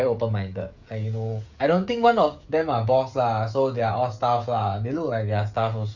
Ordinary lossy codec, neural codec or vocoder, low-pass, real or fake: none; codec, 16 kHz, 16 kbps, FreqCodec, smaller model; 7.2 kHz; fake